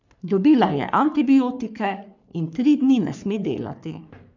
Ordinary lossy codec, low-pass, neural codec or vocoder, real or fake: none; 7.2 kHz; codec, 44.1 kHz, 3.4 kbps, Pupu-Codec; fake